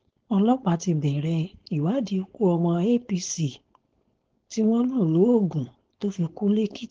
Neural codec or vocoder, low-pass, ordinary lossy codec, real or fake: codec, 16 kHz, 4.8 kbps, FACodec; 7.2 kHz; Opus, 16 kbps; fake